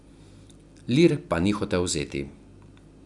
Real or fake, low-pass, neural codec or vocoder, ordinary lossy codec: real; 10.8 kHz; none; none